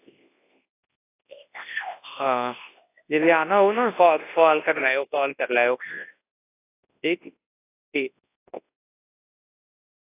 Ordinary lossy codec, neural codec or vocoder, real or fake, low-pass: AAC, 24 kbps; codec, 24 kHz, 0.9 kbps, WavTokenizer, large speech release; fake; 3.6 kHz